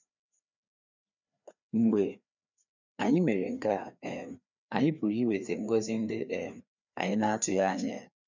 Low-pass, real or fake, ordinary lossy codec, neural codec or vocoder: 7.2 kHz; fake; none; codec, 16 kHz, 2 kbps, FreqCodec, larger model